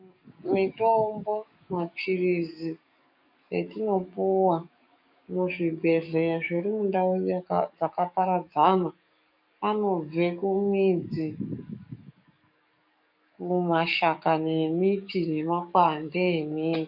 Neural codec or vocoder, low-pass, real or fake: codec, 44.1 kHz, 7.8 kbps, DAC; 5.4 kHz; fake